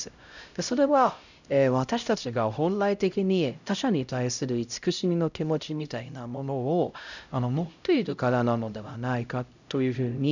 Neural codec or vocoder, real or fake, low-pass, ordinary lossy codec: codec, 16 kHz, 0.5 kbps, X-Codec, HuBERT features, trained on LibriSpeech; fake; 7.2 kHz; none